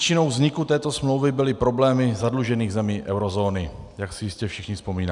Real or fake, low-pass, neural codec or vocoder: real; 10.8 kHz; none